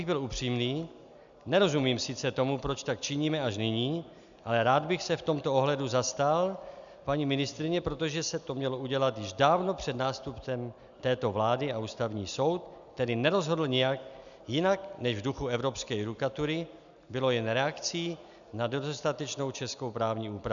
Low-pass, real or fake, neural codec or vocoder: 7.2 kHz; real; none